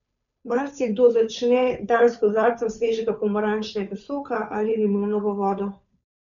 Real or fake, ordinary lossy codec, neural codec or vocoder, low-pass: fake; none; codec, 16 kHz, 2 kbps, FunCodec, trained on Chinese and English, 25 frames a second; 7.2 kHz